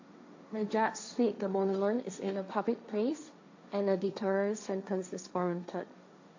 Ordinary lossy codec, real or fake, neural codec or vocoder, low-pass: AAC, 48 kbps; fake; codec, 16 kHz, 1.1 kbps, Voila-Tokenizer; 7.2 kHz